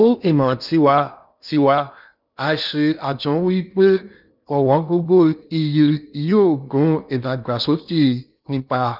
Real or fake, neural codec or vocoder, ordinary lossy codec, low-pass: fake; codec, 16 kHz in and 24 kHz out, 0.6 kbps, FocalCodec, streaming, 2048 codes; none; 5.4 kHz